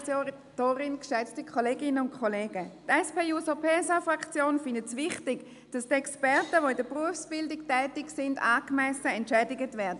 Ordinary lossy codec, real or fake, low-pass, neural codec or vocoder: AAC, 96 kbps; real; 10.8 kHz; none